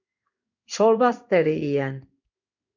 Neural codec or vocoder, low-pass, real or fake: codec, 16 kHz in and 24 kHz out, 1 kbps, XY-Tokenizer; 7.2 kHz; fake